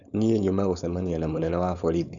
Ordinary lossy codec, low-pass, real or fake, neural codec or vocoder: none; 7.2 kHz; fake; codec, 16 kHz, 4.8 kbps, FACodec